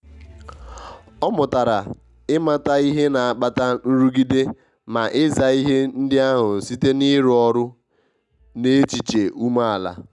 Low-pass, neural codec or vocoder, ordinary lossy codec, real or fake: 10.8 kHz; none; none; real